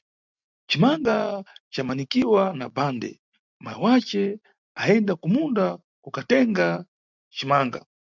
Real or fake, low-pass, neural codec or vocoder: real; 7.2 kHz; none